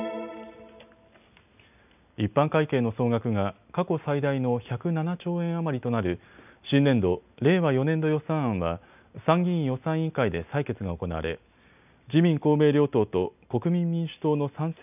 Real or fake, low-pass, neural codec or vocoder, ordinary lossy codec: real; 3.6 kHz; none; none